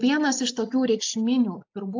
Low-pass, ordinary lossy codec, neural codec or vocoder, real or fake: 7.2 kHz; AAC, 48 kbps; none; real